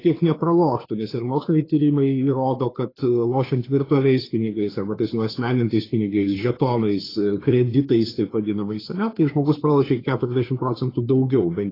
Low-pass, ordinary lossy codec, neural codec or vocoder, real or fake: 5.4 kHz; AAC, 24 kbps; codec, 16 kHz, 2 kbps, FunCodec, trained on Chinese and English, 25 frames a second; fake